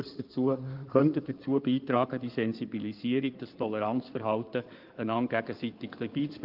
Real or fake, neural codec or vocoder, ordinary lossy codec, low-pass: fake; codec, 16 kHz in and 24 kHz out, 2.2 kbps, FireRedTTS-2 codec; Opus, 32 kbps; 5.4 kHz